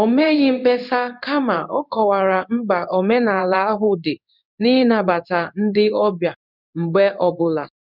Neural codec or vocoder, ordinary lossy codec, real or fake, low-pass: codec, 16 kHz in and 24 kHz out, 1 kbps, XY-Tokenizer; none; fake; 5.4 kHz